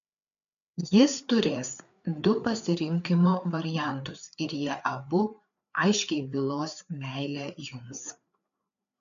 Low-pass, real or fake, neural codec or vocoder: 7.2 kHz; fake; codec, 16 kHz, 4 kbps, FreqCodec, larger model